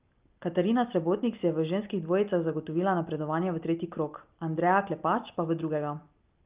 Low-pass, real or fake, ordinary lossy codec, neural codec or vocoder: 3.6 kHz; real; Opus, 32 kbps; none